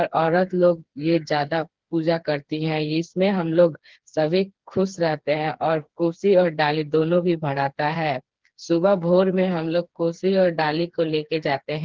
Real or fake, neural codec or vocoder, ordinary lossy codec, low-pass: fake; codec, 16 kHz, 4 kbps, FreqCodec, smaller model; Opus, 16 kbps; 7.2 kHz